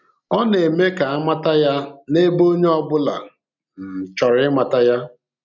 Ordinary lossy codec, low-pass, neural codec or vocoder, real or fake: none; 7.2 kHz; none; real